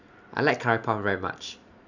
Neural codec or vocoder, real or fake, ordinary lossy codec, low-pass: none; real; none; 7.2 kHz